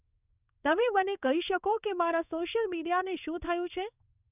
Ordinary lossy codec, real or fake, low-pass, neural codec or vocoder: none; fake; 3.6 kHz; codec, 16 kHz in and 24 kHz out, 1 kbps, XY-Tokenizer